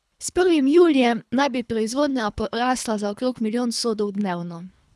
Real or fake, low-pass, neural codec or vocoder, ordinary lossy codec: fake; none; codec, 24 kHz, 3 kbps, HILCodec; none